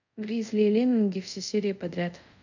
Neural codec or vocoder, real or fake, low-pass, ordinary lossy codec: codec, 24 kHz, 0.5 kbps, DualCodec; fake; 7.2 kHz; none